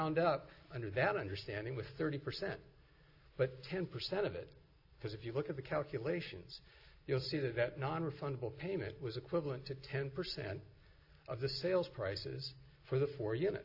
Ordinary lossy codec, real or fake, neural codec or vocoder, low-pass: AAC, 32 kbps; real; none; 5.4 kHz